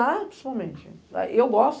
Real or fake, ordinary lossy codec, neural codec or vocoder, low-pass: real; none; none; none